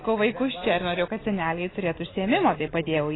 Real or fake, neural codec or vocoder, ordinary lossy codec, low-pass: real; none; AAC, 16 kbps; 7.2 kHz